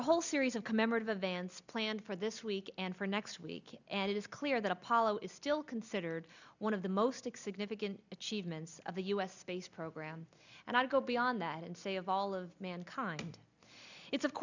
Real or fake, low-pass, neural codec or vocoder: real; 7.2 kHz; none